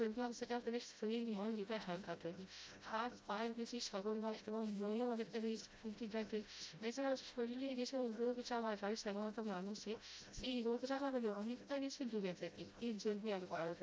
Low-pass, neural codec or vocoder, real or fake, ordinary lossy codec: none; codec, 16 kHz, 0.5 kbps, FreqCodec, smaller model; fake; none